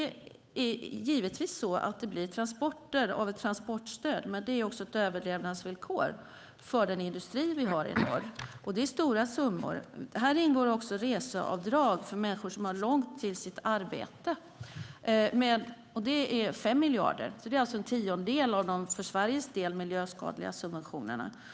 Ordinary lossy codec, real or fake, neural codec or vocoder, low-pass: none; fake; codec, 16 kHz, 8 kbps, FunCodec, trained on Chinese and English, 25 frames a second; none